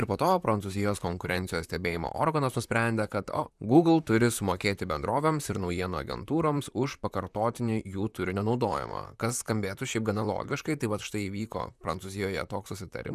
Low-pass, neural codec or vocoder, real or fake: 14.4 kHz; vocoder, 44.1 kHz, 128 mel bands, Pupu-Vocoder; fake